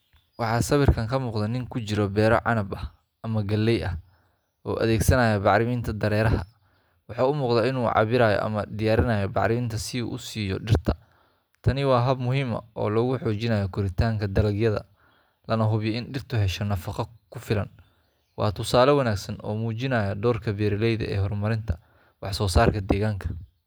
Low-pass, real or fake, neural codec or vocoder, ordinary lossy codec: none; real; none; none